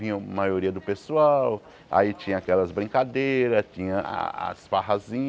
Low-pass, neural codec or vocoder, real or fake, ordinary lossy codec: none; none; real; none